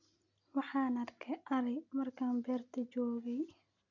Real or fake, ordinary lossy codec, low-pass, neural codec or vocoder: real; none; 7.2 kHz; none